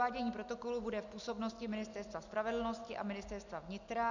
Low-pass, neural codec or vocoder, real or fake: 7.2 kHz; none; real